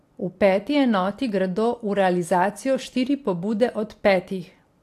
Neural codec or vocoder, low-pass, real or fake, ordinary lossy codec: none; 14.4 kHz; real; AAC, 64 kbps